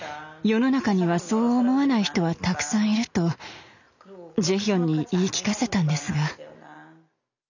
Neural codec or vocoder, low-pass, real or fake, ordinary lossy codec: none; 7.2 kHz; real; none